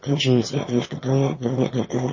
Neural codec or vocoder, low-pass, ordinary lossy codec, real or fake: autoencoder, 22.05 kHz, a latent of 192 numbers a frame, VITS, trained on one speaker; 7.2 kHz; MP3, 32 kbps; fake